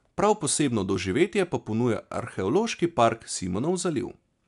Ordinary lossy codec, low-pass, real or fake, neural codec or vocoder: none; 10.8 kHz; real; none